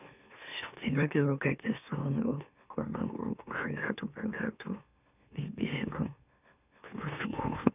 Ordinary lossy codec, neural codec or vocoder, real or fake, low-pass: none; autoencoder, 44.1 kHz, a latent of 192 numbers a frame, MeloTTS; fake; 3.6 kHz